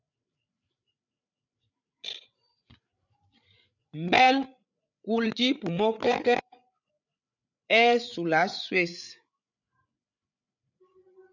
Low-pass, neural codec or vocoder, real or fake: 7.2 kHz; codec, 16 kHz, 8 kbps, FreqCodec, larger model; fake